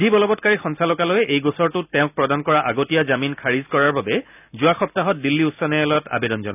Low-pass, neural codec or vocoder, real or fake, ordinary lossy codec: 3.6 kHz; none; real; none